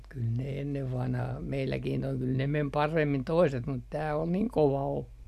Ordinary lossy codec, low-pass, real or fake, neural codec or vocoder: none; 14.4 kHz; real; none